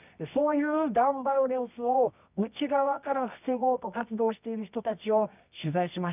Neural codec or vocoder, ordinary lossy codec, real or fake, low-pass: codec, 24 kHz, 0.9 kbps, WavTokenizer, medium music audio release; none; fake; 3.6 kHz